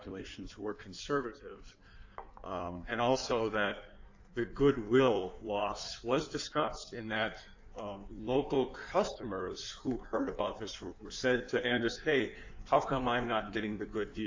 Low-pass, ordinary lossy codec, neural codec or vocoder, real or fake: 7.2 kHz; AAC, 48 kbps; codec, 16 kHz in and 24 kHz out, 1.1 kbps, FireRedTTS-2 codec; fake